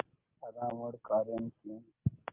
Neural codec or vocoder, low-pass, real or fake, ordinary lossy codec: none; 3.6 kHz; real; Opus, 24 kbps